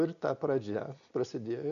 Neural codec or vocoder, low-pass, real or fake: none; 7.2 kHz; real